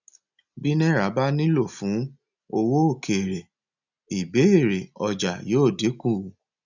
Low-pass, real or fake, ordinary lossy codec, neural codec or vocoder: 7.2 kHz; real; none; none